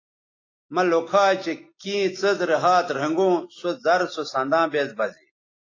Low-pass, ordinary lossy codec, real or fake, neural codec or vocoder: 7.2 kHz; AAC, 32 kbps; real; none